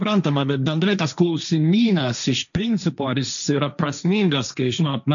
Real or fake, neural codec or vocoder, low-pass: fake; codec, 16 kHz, 1.1 kbps, Voila-Tokenizer; 7.2 kHz